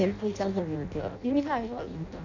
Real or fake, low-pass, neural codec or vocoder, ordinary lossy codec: fake; 7.2 kHz; codec, 16 kHz in and 24 kHz out, 0.6 kbps, FireRedTTS-2 codec; none